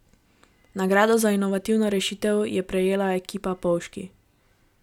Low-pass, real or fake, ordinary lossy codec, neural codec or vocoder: 19.8 kHz; real; none; none